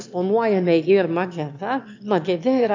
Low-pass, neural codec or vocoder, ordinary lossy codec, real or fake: 7.2 kHz; autoencoder, 22.05 kHz, a latent of 192 numbers a frame, VITS, trained on one speaker; MP3, 64 kbps; fake